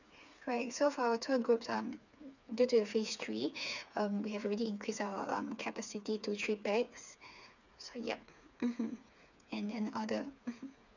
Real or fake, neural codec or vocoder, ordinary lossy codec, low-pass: fake; codec, 16 kHz, 4 kbps, FreqCodec, smaller model; none; 7.2 kHz